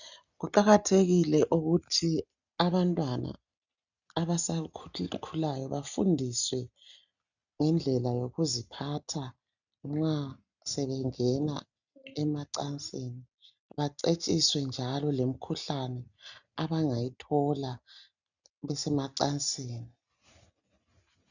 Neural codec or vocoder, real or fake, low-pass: none; real; 7.2 kHz